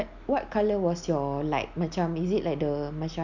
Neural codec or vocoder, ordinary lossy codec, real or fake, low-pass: none; none; real; 7.2 kHz